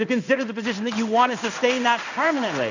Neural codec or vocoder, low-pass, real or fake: codec, 16 kHz in and 24 kHz out, 1 kbps, XY-Tokenizer; 7.2 kHz; fake